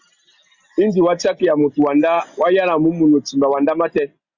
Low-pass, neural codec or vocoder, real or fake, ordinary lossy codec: 7.2 kHz; none; real; Opus, 64 kbps